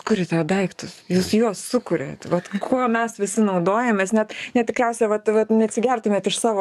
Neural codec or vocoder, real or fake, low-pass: codec, 44.1 kHz, 7.8 kbps, DAC; fake; 14.4 kHz